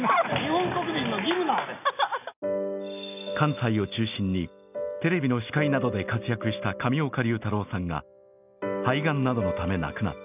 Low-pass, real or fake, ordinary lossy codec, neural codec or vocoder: 3.6 kHz; real; none; none